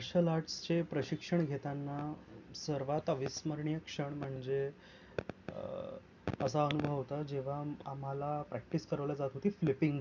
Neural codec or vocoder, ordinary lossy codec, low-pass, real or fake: none; none; 7.2 kHz; real